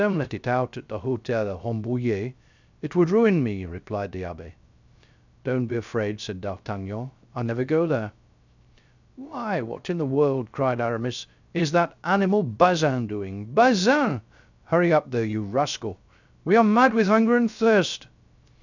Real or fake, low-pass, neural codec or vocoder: fake; 7.2 kHz; codec, 16 kHz, 0.3 kbps, FocalCodec